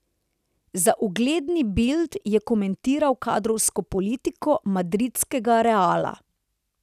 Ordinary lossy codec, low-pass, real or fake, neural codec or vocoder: none; 14.4 kHz; real; none